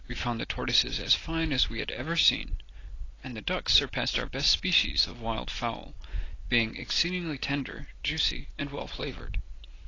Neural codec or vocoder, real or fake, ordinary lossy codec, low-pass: none; real; AAC, 32 kbps; 7.2 kHz